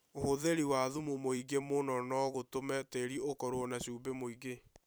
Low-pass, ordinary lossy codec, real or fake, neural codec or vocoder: none; none; fake; vocoder, 44.1 kHz, 128 mel bands every 256 samples, BigVGAN v2